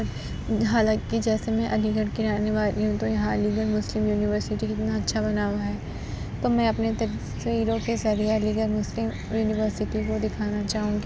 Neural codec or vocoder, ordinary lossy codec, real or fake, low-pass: none; none; real; none